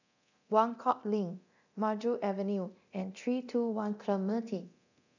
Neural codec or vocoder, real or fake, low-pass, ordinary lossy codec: codec, 24 kHz, 0.9 kbps, DualCodec; fake; 7.2 kHz; none